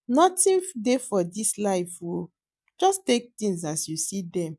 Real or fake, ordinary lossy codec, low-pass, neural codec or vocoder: real; none; none; none